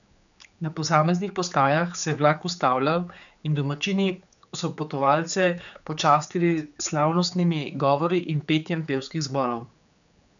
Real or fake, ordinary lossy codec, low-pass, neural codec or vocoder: fake; none; 7.2 kHz; codec, 16 kHz, 4 kbps, X-Codec, HuBERT features, trained on general audio